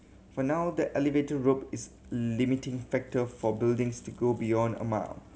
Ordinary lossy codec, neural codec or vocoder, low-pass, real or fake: none; none; none; real